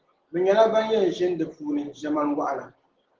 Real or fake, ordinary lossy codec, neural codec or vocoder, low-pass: real; Opus, 16 kbps; none; 7.2 kHz